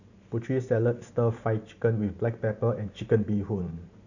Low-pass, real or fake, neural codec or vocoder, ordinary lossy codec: 7.2 kHz; fake; vocoder, 44.1 kHz, 128 mel bands every 512 samples, BigVGAN v2; none